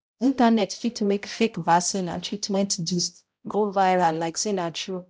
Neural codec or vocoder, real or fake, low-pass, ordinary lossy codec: codec, 16 kHz, 0.5 kbps, X-Codec, HuBERT features, trained on balanced general audio; fake; none; none